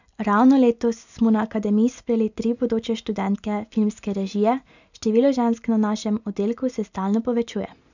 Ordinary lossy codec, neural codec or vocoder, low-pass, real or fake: none; none; 7.2 kHz; real